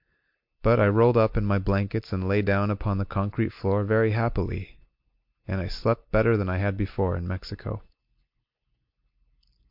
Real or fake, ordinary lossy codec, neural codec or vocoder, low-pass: real; MP3, 48 kbps; none; 5.4 kHz